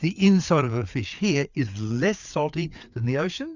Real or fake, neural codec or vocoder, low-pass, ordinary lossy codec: fake; codec, 16 kHz, 4 kbps, FreqCodec, larger model; 7.2 kHz; Opus, 64 kbps